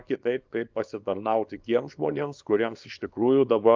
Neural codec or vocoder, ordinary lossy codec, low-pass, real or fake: codec, 24 kHz, 0.9 kbps, WavTokenizer, small release; Opus, 24 kbps; 7.2 kHz; fake